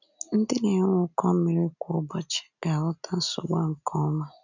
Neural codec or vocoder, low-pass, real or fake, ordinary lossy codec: none; 7.2 kHz; real; none